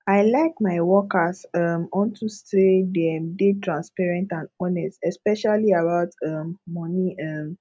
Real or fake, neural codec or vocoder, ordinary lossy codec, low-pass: real; none; none; none